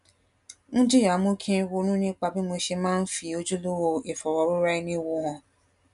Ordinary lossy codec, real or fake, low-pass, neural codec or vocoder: none; real; 10.8 kHz; none